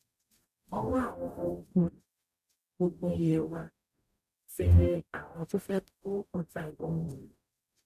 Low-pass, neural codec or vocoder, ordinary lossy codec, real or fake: 14.4 kHz; codec, 44.1 kHz, 0.9 kbps, DAC; none; fake